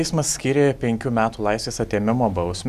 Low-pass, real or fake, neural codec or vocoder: 14.4 kHz; real; none